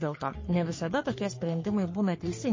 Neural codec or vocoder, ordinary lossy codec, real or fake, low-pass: codec, 44.1 kHz, 3.4 kbps, Pupu-Codec; MP3, 32 kbps; fake; 7.2 kHz